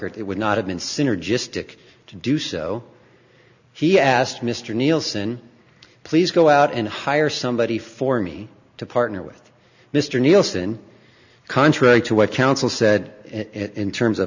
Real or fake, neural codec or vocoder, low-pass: real; none; 7.2 kHz